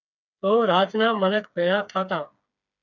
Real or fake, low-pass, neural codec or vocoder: fake; 7.2 kHz; codec, 16 kHz, 4 kbps, FreqCodec, smaller model